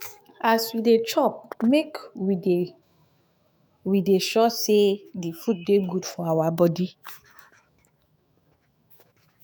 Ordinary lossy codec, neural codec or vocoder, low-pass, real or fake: none; autoencoder, 48 kHz, 128 numbers a frame, DAC-VAE, trained on Japanese speech; none; fake